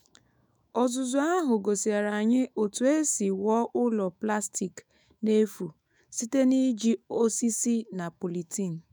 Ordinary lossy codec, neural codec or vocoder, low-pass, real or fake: none; autoencoder, 48 kHz, 128 numbers a frame, DAC-VAE, trained on Japanese speech; none; fake